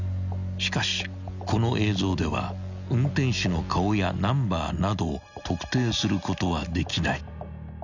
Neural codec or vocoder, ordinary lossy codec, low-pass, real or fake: none; none; 7.2 kHz; real